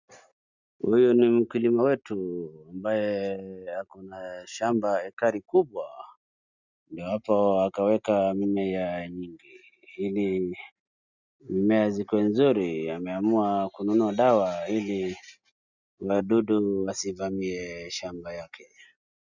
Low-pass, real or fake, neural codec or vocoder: 7.2 kHz; real; none